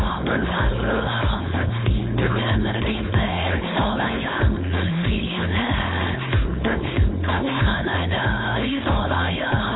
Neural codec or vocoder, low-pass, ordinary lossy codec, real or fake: codec, 16 kHz, 4.8 kbps, FACodec; 7.2 kHz; AAC, 16 kbps; fake